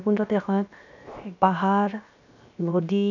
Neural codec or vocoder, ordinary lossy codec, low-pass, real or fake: codec, 16 kHz, 0.7 kbps, FocalCodec; none; 7.2 kHz; fake